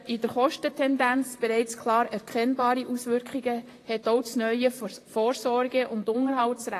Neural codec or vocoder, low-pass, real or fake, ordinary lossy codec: vocoder, 44.1 kHz, 128 mel bands, Pupu-Vocoder; 14.4 kHz; fake; AAC, 48 kbps